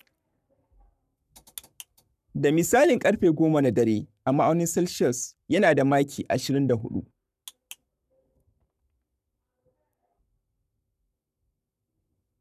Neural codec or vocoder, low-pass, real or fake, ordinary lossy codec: codec, 44.1 kHz, 7.8 kbps, Pupu-Codec; 14.4 kHz; fake; none